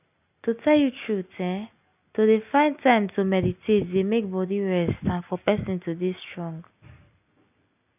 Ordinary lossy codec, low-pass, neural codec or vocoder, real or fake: none; 3.6 kHz; none; real